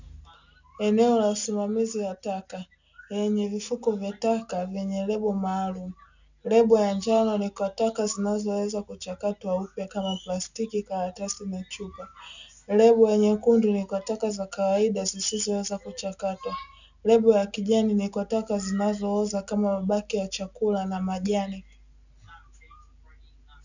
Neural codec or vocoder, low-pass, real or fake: none; 7.2 kHz; real